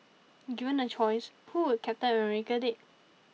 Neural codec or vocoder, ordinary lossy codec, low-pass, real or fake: none; none; none; real